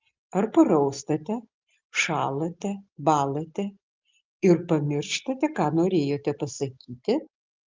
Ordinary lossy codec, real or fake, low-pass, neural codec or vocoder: Opus, 32 kbps; real; 7.2 kHz; none